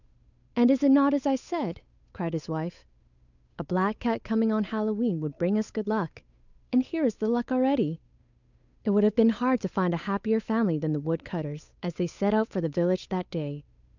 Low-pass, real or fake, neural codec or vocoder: 7.2 kHz; fake; codec, 16 kHz, 8 kbps, FunCodec, trained on Chinese and English, 25 frames a second